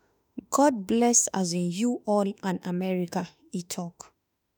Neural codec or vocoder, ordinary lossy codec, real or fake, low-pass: autoencoder, 48 kHz, 32 numbers a frame, DAC-VAE, trained on Japanese speech; none; fake; none